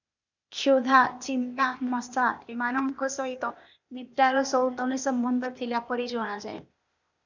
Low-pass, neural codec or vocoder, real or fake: 7.2 kHz; codec, 16 kHz, 0.8 kbps, ZipCodec; fake